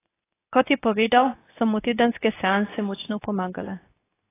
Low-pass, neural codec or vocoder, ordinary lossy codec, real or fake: 3.6 kHz; codec, 24 kHz, 0.9 kbps, WavTokenizer, medium speech release version 2; AAC, 16 kbps; fake